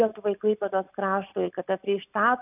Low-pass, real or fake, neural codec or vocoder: 3.6 kHz; real; none